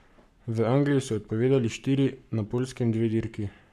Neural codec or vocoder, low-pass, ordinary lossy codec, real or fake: codec, 44.1 kHz, 7.8 kbps, Pupu-Codec; 14.4 kHz; none; fake